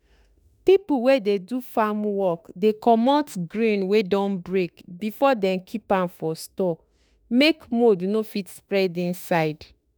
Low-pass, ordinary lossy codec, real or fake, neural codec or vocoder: none; none; fake; autoencoder, 48 kHz, 32 numbers a frame, DAC-VAE, trained on Japanese speech